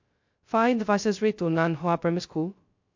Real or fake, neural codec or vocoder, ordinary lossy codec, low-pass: fake; codec, 16 kHz, 0.2 kbps, FocalCodec; MP3, 48 kbps; 7.2 kHz